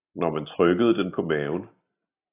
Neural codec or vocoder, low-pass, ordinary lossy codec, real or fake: none; 3.6 kHz; AAC, 16 kbps; real